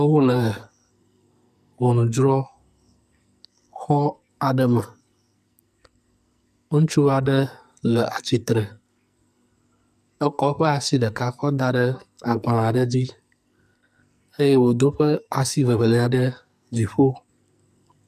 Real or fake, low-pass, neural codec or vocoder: fake; 14.4 kHz; codec, 44.1 kHz, 2.6 kbps, SNAC